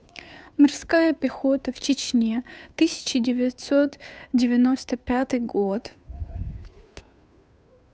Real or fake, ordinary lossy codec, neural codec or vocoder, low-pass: fake; none; codec, 16 kHz, 2 kbps, FunCodec, trained on Chinese and English, 25 frames a second; none